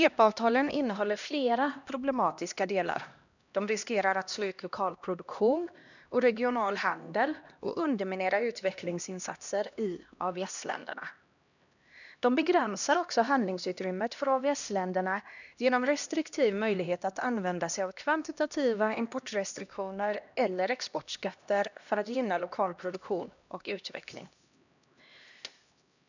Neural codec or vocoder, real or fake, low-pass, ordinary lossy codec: codec, 16 kHz, 1 kbps, X-Codec, HuBERT features, trained on LibriSpeech; fake; 7.2 kHz; none